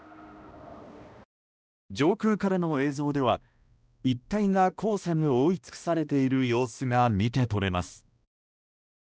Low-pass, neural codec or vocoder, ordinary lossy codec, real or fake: none; codec, 16 kHz, 1 kbps, X-Codec, HuBERT features, trained on balanced general audio; none; fake